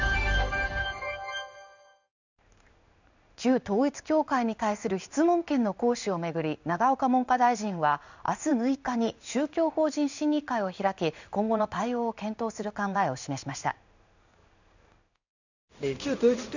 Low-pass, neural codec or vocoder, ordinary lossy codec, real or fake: 7.2 kHz; codec, 16 kHz in and 24 kHz out, 1 kbps, XY-Tokenizer; none; fake